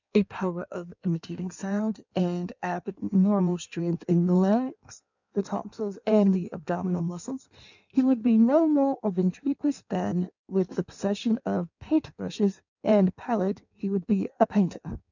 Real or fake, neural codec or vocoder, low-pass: fake; codec, 16 kHz in and 24 kHz out, 1.1 kbps, FireRedTTS-2 codec; 7.2 kHz